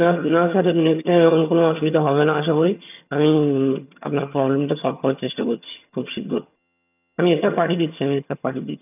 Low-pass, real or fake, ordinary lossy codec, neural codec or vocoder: 3.6 kHz; fake; none; vocoder, 22.05 kHz, 80 mel bands, HiFi-GAN